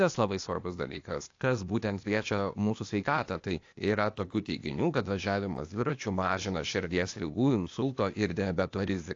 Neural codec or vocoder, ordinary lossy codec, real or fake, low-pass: codec, 16 kHz, 0.8 kbps, ZipCodec; AAC, 48 kbps; fake; 7.2 kHz